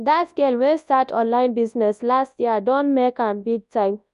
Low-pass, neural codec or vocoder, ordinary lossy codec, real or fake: 10.8 kHz; codec, 24 kHz, 0.9 kbps, WavTokenizer, large speech release; none; fake